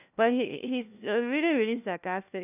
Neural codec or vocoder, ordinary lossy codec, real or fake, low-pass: codec, 16 kHz, 1 kbps, FunCodec, trained on LibriTTS, 50 frames a second; none; fake; 3.6 kHz